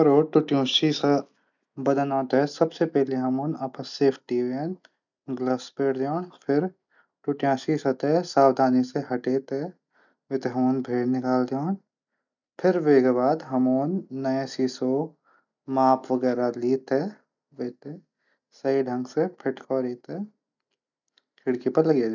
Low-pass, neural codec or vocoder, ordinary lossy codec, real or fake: 7.2 kHz; none; none; real